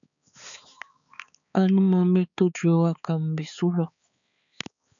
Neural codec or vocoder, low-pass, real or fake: codec, 16 kHz, 4 kbps, X-Codec, HuBERT features, trained on balanced general audio; 7.2 kHz; fake